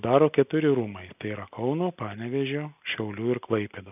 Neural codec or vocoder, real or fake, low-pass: none; real; 3.6 kHz